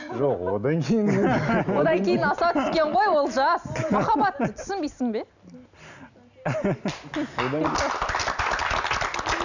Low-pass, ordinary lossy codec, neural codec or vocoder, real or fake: 7.2 kHz; none; none; real